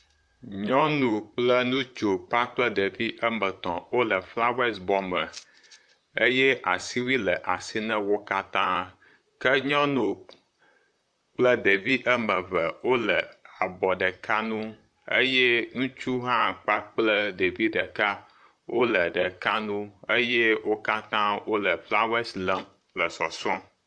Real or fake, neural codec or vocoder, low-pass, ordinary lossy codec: fake; vocoder, 44.1 kHz, 128 mel bands, Pupu-Vocoder; 9.9 kHz; AAC, 64 kbps